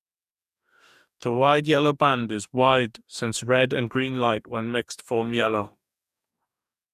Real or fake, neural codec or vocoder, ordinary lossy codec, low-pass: fake; codec, 44.1 kHz, 2.6 kbps, DAC; none; 14.4 kHz